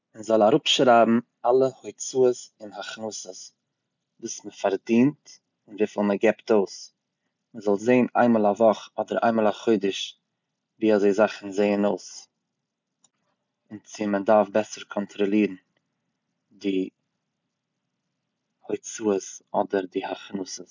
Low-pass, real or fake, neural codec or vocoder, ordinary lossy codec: 7.2 kHz; real; none; none